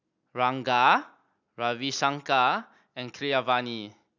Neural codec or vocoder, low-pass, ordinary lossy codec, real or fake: none; 7.2 kHz; none; real